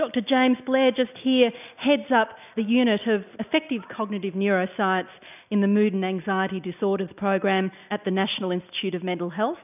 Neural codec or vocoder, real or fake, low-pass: none; real; 3.6 kHz